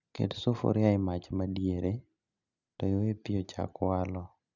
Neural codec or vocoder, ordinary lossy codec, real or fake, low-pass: none; none; real; 7.2 kHz